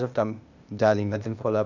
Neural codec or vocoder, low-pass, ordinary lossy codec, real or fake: codec, 16 kHz, 0.8 kbps, ZipCodec; 7.2 kHz; none; fake